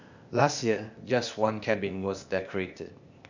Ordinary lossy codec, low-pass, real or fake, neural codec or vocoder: none; 7.2 kHz; fake; codec, 16 kHz, 0.8 kbps, ZipCodec